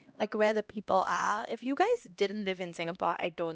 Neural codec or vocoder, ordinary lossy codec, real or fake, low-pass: codec, 16 kHz, 1 kbps, X-Codec, HuBERT features, trained on LibriSpeech; none; fake; none